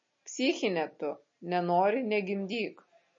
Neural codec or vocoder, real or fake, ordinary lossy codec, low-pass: none; real; MP3, 32 kbps; 7.2 kHz